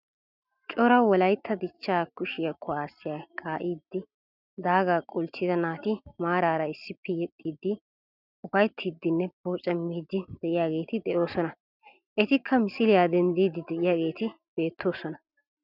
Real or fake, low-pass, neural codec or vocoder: real; 5.4 kHz; none